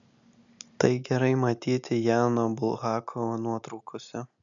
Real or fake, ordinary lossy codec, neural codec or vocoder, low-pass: real; MP3, 96 kbps; none; 7.2 kHz